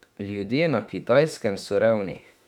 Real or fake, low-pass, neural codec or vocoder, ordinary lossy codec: fake; 19.8 kHz; autoencoder, 48 kHz, 32 numbers a frame, DAC-VAE, trained on Japanese speech; none